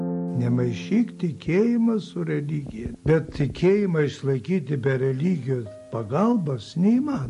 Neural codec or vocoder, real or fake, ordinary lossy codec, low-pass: none; real; MP3, 48 kbps; 14.4 kHz